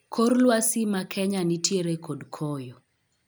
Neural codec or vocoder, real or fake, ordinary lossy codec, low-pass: none; real; none; none